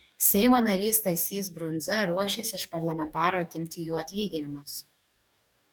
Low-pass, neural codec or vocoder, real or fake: 19.8 kHz; codec, 44.1 kHz, 2.6 kbps, DAC; fake